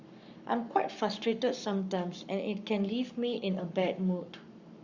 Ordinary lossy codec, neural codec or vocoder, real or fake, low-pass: Opus, 64 kbps; codec, 44.1 kHz, 7.8 kbps, Pupu-Codec; fake; 7.2 kHz